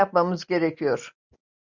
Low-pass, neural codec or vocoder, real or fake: 7.2 kHz; none; real